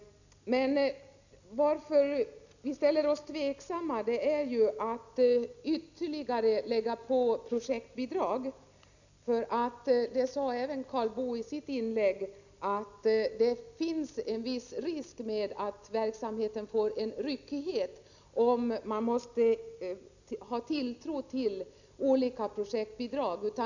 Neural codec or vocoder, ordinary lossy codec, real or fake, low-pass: none; none; real; 7.2 kHz